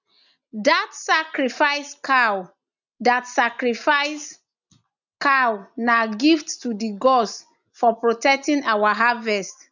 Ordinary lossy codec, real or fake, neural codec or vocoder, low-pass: none; real; none; 7.2 kHz